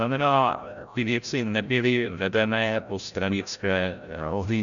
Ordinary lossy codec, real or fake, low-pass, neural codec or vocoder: MP3, 96 kbps; fake; 7.2 kHz; codec, 16 kHz, 0.5 kbps, FreqCodec, larger model